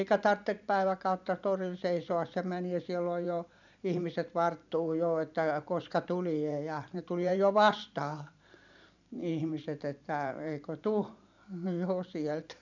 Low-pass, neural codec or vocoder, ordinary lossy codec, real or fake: 7.2 kHz; vocoder, 44.1 kHz, 128 mel bands every 512 samples, BigVGAN v2; none; fake